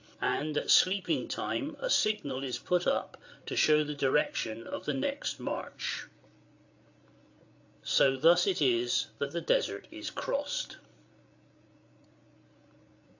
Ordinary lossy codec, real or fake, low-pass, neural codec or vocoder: AAC, 48 kbps; fake; 7.2 kHz; codec, 16 kHz, 8 kbps, FreqCodec, larger model